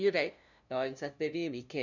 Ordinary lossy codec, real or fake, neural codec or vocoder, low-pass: none; fake; codec, 16 kHz, 0.5 kbps, FunCodec, trained on LibriTTS, 25 frames a second; 7.2 kHz